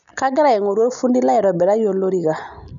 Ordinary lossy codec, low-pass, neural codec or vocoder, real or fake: none; 7.2 kHz; none; real